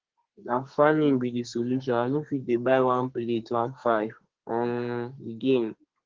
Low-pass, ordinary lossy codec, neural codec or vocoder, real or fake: 7.2 kHz; Opus, 16 kbps; codec, 32 kHz, 1.9 kbps, SNAC; fake